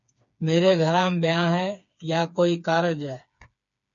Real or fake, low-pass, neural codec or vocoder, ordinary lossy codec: fake; 7.2 kHz; codec, 16 kHz, 4 kbps, FreqCodec, smaller model; MP3, 48 kbps